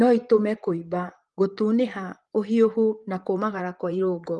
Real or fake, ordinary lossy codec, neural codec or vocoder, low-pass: fake; Opus, 24 kbps; vocoder, 44.1 kHz, 128 mel bands, Pupu-Vocoder; 10.8 kHz